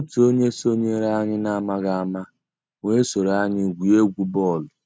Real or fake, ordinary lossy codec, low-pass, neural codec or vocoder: real; none; none; none